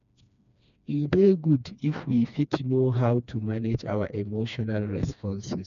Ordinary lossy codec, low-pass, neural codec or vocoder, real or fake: none; 7.2 kHz; codec, 16 kHz, 2 kbps, FreqCodec, smaller model; fake